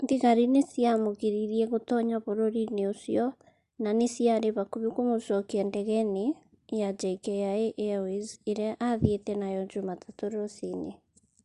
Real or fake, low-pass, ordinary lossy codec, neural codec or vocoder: real; 10.8 kHz; Opus, 64 kbps; none